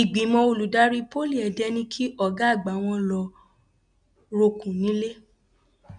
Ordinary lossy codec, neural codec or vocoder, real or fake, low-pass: none; none; real; 9.9 kHz